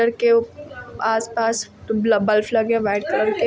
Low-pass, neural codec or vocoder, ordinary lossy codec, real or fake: none; none; none; real